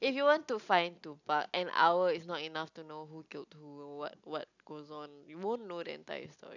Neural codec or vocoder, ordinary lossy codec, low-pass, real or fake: none; none; 7.2 kHz; real